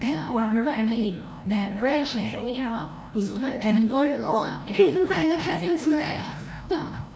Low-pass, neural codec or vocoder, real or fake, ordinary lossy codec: none; codec, 16 kHz, 0.5 kbps, FreqCodec, larger model; fake; none